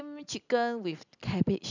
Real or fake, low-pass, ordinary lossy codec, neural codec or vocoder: real; 7.2 kHz; none; none